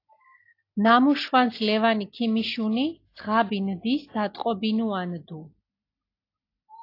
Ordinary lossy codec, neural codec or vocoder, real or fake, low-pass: AAC, 32 kbps; none; real; 5.4 kHz